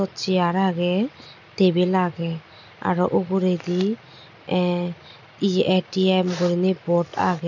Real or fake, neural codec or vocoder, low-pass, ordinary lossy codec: real; none; 7.2 kHz; none